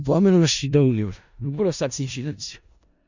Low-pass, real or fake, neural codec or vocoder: 7.2 kHz; fake; codec, 16 kHz in and 24 kHz out, 0.4 kbps, LongCat-Audio-Codec, four codebook decoder